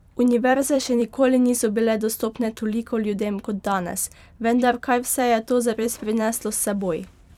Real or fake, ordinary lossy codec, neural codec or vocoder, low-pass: fake; none; vocoder, 44.1 kHz, 128 mel bands every 256 samples, BigVGAN v2; 19.8 kHz